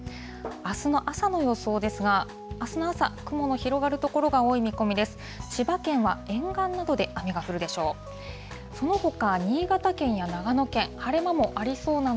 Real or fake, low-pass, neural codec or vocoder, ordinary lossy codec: real; none; none; none